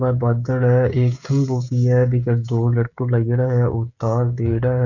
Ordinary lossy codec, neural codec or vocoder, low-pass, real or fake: none; codec, 16 kHz, 16 kbps, FreqCodec, smaller model; 7.2 kHz; fake